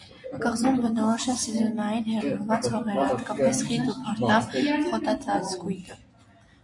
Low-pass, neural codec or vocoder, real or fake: 10.8 kHz; none; real